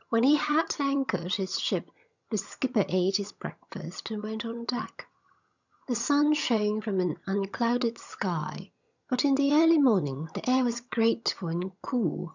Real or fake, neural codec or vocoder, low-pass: fake; vocoder, 22.05 kHz, 80 mel bands, HiFi-GAN; 7.2 kHz